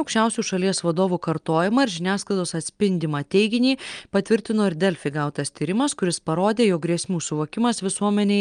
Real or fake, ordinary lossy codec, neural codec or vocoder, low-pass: real; Opus, 32 kbps; none; 9.9 kHz